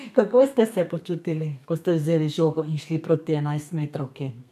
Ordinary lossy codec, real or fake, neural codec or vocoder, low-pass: none; fake; codec, 32 kHz, 1.9 kbps, SNAC; 14.4 kHz